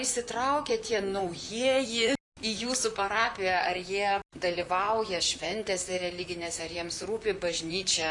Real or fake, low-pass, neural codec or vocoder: fake; 10.8 kHz; vocoder, 44.1 kHz, 128 mel bands, Pupu-Vocoder